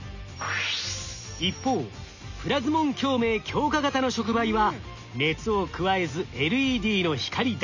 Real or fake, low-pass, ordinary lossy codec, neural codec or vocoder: real; 7.2 kHz; none; none